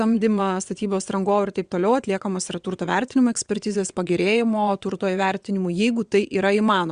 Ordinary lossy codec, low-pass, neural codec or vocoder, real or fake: Opus, 64 kbps; 9.9 kHz; vocoder, 22.05 kHz, 80 mel bands, WaveNeXt; fake